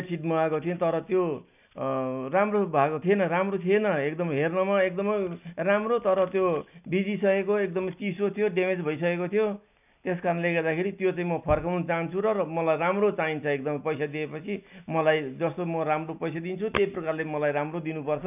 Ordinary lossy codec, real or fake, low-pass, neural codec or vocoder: none; real; 3.6 kHz; none